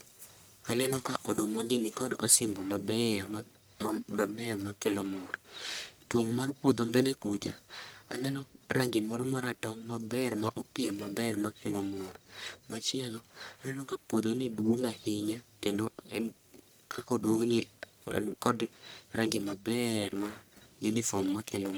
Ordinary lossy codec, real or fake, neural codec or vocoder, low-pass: none; fake; codec, 44.1 kHz, 1.7 kbps, Pupu-Codec; none